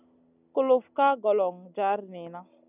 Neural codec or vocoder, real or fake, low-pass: none; real; 3.6 kHz